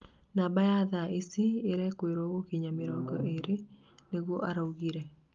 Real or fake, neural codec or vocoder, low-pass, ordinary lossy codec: real; none; 7.2 kHz; Opus, 32 kbps